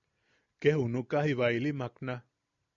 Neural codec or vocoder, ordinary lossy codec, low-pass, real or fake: none; MP3, 96 kbps; 7.2 kHz; real